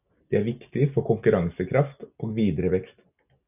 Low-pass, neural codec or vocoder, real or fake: 3.6 kHz; none; real